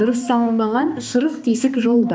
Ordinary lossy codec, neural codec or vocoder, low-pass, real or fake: none; codec, 16 kHz, 2 kbps, X-Codec, HuBERT features, trained on balanced general audio; none; fake